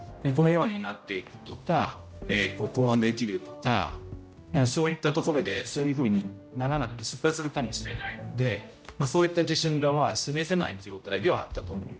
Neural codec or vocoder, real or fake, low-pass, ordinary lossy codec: codec, 16 kHz, 0.5 kbps, X-Codec, HuBERT features, trained on general audio; fake; none; none